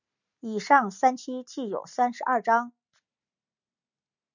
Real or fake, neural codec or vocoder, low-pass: real; none; 7.2 kHz